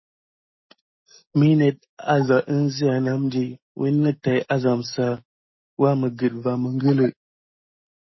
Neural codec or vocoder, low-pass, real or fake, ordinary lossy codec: none; 7.2 kHz; real; MP3, 24 kbps